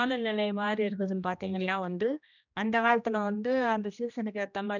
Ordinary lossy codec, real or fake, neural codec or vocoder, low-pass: none; fake; codec, 16 kHz, 1 kbps, X-Codec, HuBERT features, trained on general audio; 7.2 kHz